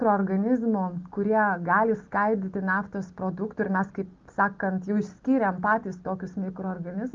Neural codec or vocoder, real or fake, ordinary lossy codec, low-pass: none; real; Opus, 32 kbps; 7.2 kHz